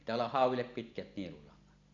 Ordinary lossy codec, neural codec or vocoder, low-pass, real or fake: none; none; 7.2 kHz; real